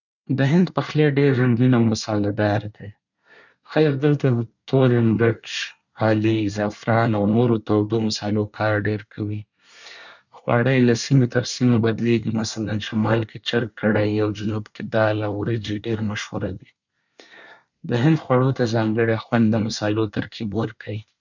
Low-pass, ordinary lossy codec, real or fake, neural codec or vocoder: 7.2 kHz; none; fake; codec, 24 kHz, 1 kbps, SNAC